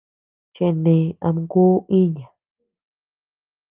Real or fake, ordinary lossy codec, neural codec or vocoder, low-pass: real; Opus, 24 kbps; none; 3.6 kHz